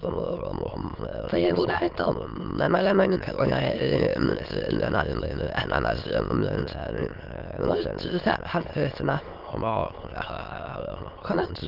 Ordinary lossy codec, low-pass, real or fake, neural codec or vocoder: Opus, 24 kbps; 5.4 kHz; fake; autoencoder, 22.05 kHz, a latent of 192 numbers a frame, VITS, trained on many speakers